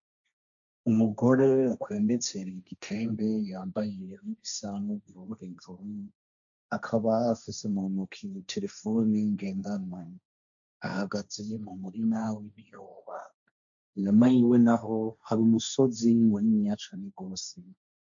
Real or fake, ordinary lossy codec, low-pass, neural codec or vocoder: fake; MP3, 64 kbps; 7.2 kHz; codec, 16 kHz, 1.1 kbps, Voila-Tokenizer